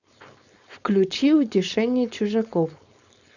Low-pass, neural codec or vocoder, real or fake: 7.2 kHz; codec, 16 kHz, 4.8 kbps, FACodec; fake